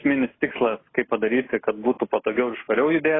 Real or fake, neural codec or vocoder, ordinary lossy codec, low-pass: real; none; AAC, 16 kbps; 7.2 kHz